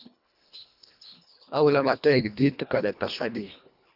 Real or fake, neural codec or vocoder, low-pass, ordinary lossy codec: fake; codec, 24 kHz, 1.5 kbps, HILCodec; 5.4 kHz; AAC, 48 kbps